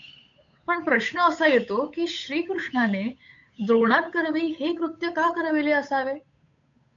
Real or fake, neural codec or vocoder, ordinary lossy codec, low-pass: fake; codec, 16 kHz, 8 kbps, FunCodec, trained on Chinese and English, 25 frames a second; AAC, 48 kbps; 7.2 kHz